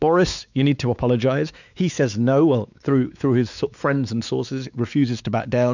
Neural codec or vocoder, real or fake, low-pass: codec, 16 kHz, 4 kbps, X-Codec, WavLM features, trained on Multilingual LibriSpeech; fake; 7.2 kHz